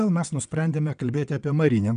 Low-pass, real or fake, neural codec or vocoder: 9.9 kHz; fake; vocoder, 22.05 kHz, 80 mel bands, WaveNeXt